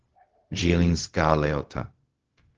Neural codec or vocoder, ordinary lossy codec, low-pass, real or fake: codec, 16 kHz, 0.4 kbps, LongCat-Audio-Codec; Opus, 16 kbps; 7.2 kHz; fake